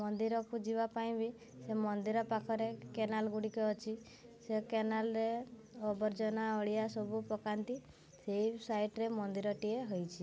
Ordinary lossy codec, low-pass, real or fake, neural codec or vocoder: none; none; real; none